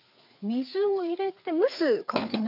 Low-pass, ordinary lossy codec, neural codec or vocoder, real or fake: 5.4 kHz; none; codec, 16 kHz, 4 kbps, FreqCodec, larger model; fake